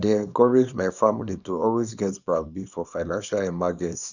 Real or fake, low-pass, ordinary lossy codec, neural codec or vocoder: fake; 7.2 kHz; none; codec, 24 kHz, 0.9 kbps, WavTokenizer, small release